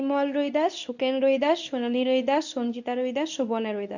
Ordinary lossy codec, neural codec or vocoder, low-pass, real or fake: none; codec, 24 kHz, 0.9 kbps, WavTokenizer, medium speech release version 2; 7.2 kHz; fake